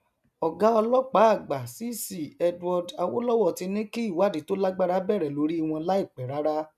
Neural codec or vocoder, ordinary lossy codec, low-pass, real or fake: none; none; 14.4 kHz; real